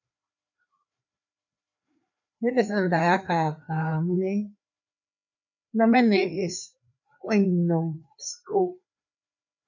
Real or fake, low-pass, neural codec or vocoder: fake; 7.2 kHz; codec, 16 kHz, 2 kbps, FreqCodec, larger model